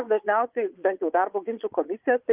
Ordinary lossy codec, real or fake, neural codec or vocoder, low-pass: Opus, 32 kbps; fake; vocoder, 22.05 kHz, 80 mel bands, Vocos; 3.6 kHz